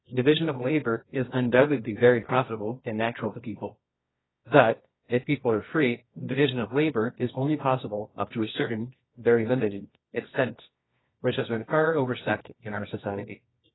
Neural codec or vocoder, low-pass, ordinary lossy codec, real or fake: codec, 24 kHz, 0.9 kbps, WavTokenizer, medium music audio release; 7.2 kHz; AAC, 16 kbps; fake